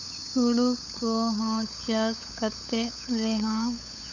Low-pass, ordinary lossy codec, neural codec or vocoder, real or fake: 7.2 kHz; none; codec, 16 kHz, 8 kbps, FunCodec, trained on LibriTTS, 25 frames a second; fake